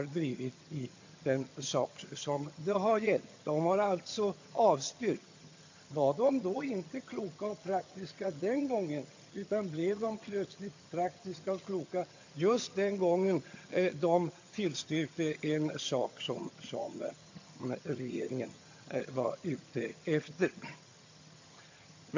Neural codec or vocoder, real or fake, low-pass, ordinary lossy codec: vocoder, 22.05 kHz, 80 mel bands, HiFi-GAN; fake; 7.2 kHz; AAC, 48 kbps